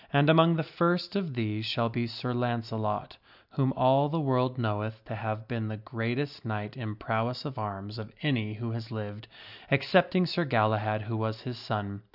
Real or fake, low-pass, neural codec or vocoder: real; 5.4 kHz; none